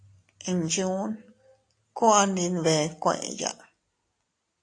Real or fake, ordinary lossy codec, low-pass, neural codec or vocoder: real; AAC, 48 kbps; 9.9 kHz; none